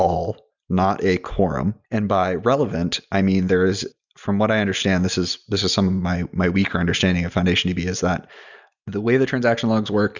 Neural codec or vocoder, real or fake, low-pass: none; real; 7.2 kHz